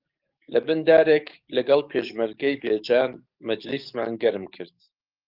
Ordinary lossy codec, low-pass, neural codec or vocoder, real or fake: Opus, 24 kbps; 5.4 kHz; codec, 44.1 kHz, 7.8 kbps, DAC; fake